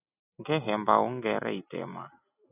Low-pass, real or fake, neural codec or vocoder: 3.6 kHz; real; none